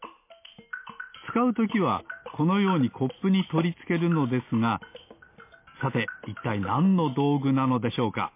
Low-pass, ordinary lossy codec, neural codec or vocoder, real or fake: 3.6 kHz; MP3, 24 kbps; none; real